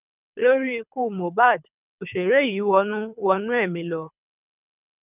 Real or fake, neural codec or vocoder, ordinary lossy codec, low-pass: fake; codec, 24 kHz, 3 kbps, HILCodec; none; 3.6 kHz